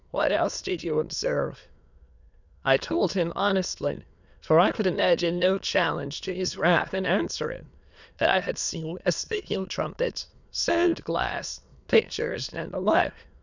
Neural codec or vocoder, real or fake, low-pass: autoencoder, 22.05 kHz, a latent of 192 numbers a frame, VITS, trained on many speakers; fake; 7.2 kHz